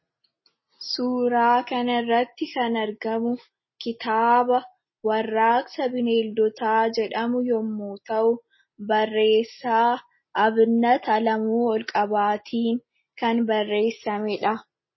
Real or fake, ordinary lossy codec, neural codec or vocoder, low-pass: real; MP3, 24 kbps; none; 7.2 kHz